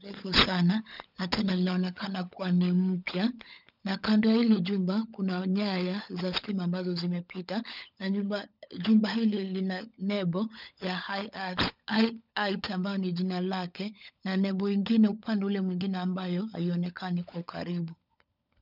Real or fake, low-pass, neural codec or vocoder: fake; 5.4 kHz; codec, 16 kHz, 16 kbps, FunCodec, trained on LibriTTS, 50 frames a second